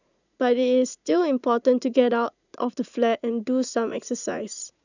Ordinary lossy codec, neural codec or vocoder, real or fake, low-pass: none; vocoder, 44.1 kHz, 80 mel bands, Vocos; fake; 7.2 kHz